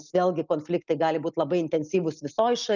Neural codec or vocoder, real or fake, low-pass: none; real; 7.2 kHz